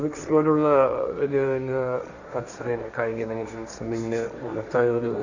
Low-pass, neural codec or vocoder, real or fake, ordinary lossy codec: 7.2 kHz; codec, 16 kHz, 1.1 kbps, Voila-Tokenizer; fake; none